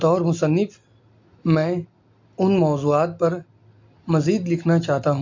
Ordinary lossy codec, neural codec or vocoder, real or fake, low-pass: MP3, 48 kbps; none; real; 7.2 kHz